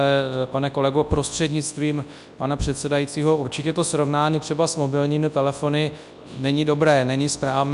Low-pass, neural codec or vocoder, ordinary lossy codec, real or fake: 10.8 kHz; codec, 24 kHz, 0.9 kbps, WavTokenizer, large speech release; AAC, 96 kbps; fake